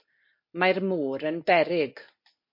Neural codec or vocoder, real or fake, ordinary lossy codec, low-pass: none; real; MP3, 32 kbps; 5.4 kHz